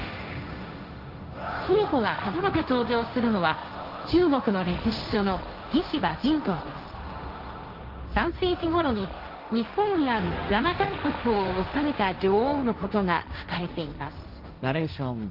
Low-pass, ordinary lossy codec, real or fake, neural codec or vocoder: 5.4 kHz; Opus, 32 kbps; fake; codec, 16 kHz, 1.1 kbps, Voila-Tokenizer